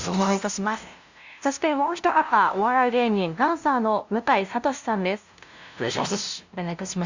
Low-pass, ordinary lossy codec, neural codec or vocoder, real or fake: 7.2 kHz; Opus, 64 kbps; codec, 16 kHz, 0.5 kbps, FunCodec, trained on LibriTTS, 25 frames a second; fake